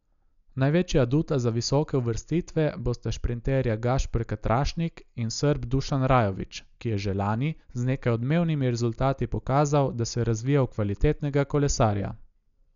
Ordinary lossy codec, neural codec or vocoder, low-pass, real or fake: none; none; 7.2 kHz; real